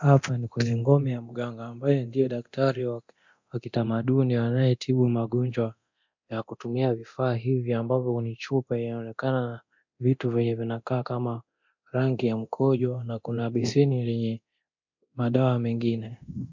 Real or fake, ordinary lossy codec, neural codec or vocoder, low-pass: fake; MP3, 48 kbps; codec, 24 kHz, 0.9 kbps, DualCodec; 7.2 kHz